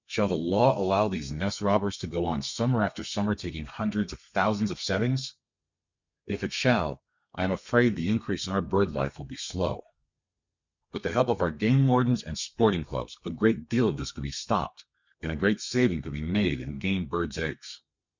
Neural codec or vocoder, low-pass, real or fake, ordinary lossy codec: codec, 32 kHz, 1.9 kbps, SNAC; 7.2 kHz; fake; Opus, 64 kbps